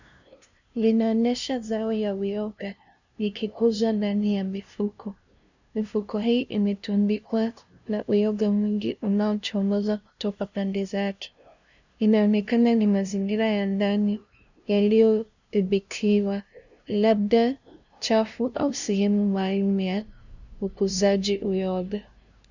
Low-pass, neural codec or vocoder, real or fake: 7.2 kHz; codec, 16 kHz, 0.5 kbps, FunCodec, trained on LibriTTS, 25 frames a second; fake